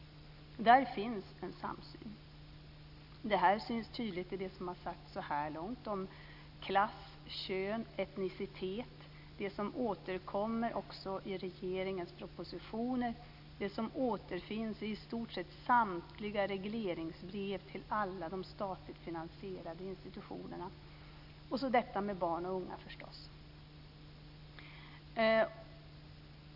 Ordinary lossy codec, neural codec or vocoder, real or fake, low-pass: none; none; real; 5.4 kHz